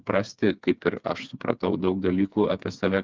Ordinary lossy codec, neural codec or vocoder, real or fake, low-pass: Opus, 32 kbps; codec, 16 kHz, 4 kbps, FreqCodec, smaller model; fake; 7.2 kHz